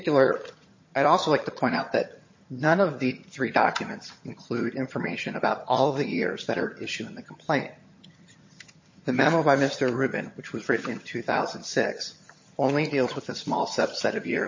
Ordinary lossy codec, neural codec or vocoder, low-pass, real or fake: MP3, 32 kbps; vocoder, 22.05 kHz, 80 mel bands, HiFi-GAN; 7.2 kHz; fake